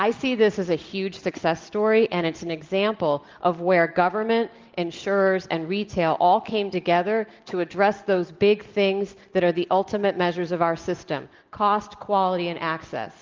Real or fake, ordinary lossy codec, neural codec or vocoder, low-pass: real; Opus, 32 kbps; none; 7.2 kHz